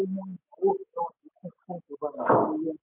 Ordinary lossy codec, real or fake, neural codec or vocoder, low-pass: MP3, 24 kbps; real; none; 3.6 kHz